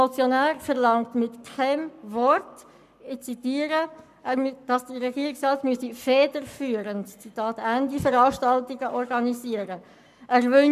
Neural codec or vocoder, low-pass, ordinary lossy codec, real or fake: codec, 44.1 kHz, 7.8 kbps, Pupu-Codec; 14.4 kHz; none; fake